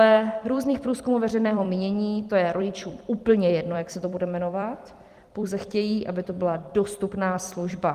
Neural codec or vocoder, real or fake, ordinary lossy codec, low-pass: vocoder, 44.1 kHz, 128 mel bands every 256 samples, BigVGAN v2; fake; Opus, 24 kbps; 14.4 kHz